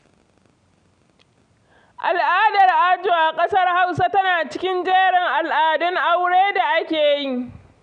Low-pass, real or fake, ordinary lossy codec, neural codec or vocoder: 9.9 kHz; real; none; none